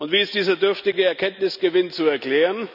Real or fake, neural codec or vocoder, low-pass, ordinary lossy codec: real; none; 5.4 kHz; none